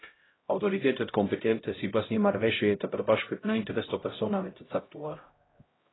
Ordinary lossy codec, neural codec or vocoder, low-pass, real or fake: AAC, 16 kbps; codec, 16 kHz, 0.5 kbps, X-Codec, HuBERT features, trained on LibriSpeech; 7.2 kHz; fake